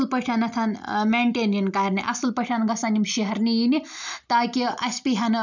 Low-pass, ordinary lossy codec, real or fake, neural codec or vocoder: 7.2 kHz; none; real; none